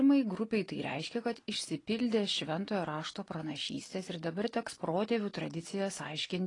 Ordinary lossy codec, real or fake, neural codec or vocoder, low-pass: AAC, 32 kbps; real; none; 10.8 kHz